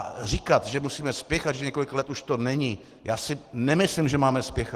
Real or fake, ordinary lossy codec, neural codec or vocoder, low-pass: real; Opus, 16 kbps; none; 14.4 kHz